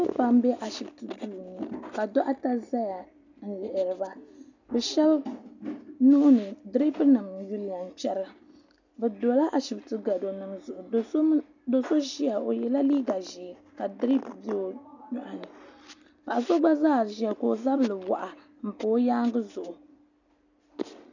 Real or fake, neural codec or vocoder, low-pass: real; none; 7.2 kHz